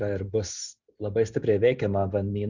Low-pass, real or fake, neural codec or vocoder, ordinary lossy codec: 7.2 kHz; real; none; Opus, 64 kbps